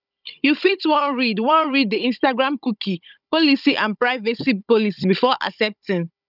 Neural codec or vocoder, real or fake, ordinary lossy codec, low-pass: codec, 16 kHz, 16 kbps, FunCodec, trained on Chinese and English, 50 frames a second; fake; none; 5.4 kHz